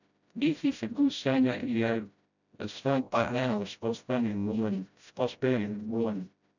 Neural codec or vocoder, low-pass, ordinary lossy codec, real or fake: codec, 16 kHz, 0.5 kbps, FreqCodec, smaller model; 7.2 kHz; none; fake